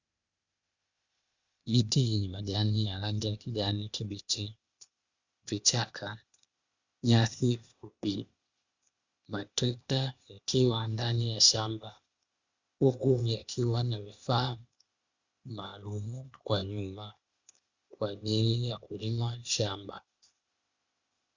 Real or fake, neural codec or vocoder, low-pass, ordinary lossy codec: fake; codec, 16 kHz, 0.8 kbps, ZipCodec; 7.2 kHz; Opus, 64 kbps